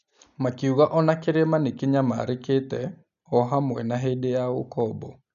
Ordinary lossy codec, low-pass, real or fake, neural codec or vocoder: none; 7.2 kHz; real; none